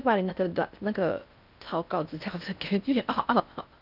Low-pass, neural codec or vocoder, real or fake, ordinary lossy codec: 5.4 kHz; codec, 16 kHz in and 24 kHz out, 0.6 kbps, FocalCodec, streaming, 4096 codes; fake; none